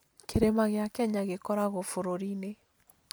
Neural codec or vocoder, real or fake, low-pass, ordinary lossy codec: none; real; none; none